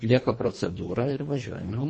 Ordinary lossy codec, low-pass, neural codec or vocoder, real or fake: MP3, 32 kbps; 9.9 kHz; codec, 24 kHz, 1.5 kbps, HILCodec; fake